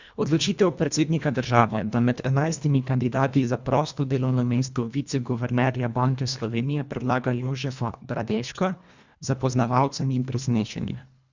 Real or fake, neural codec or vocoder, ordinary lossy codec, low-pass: fake; codec, 24 kHz, 1.5 kbps, HILCodec; Opus, 64 kbps; 7.2 kHz